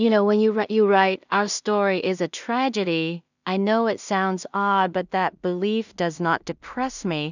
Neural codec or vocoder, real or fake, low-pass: codec, 16 kHz in and 24 kHz out, 0.4 kbps, LongCat-Audio-Codec, two codebook decoder; fake; 7.2 kHz